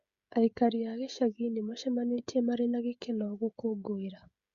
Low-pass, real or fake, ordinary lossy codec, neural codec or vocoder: 7.2 kHz; fake; Opus, 64 kbps; codec, 16 kHz, 16 kbps, FreqCodec, smaller model